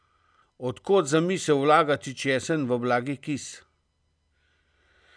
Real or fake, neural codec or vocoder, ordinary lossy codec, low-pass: real; none; none; 9.9 kHz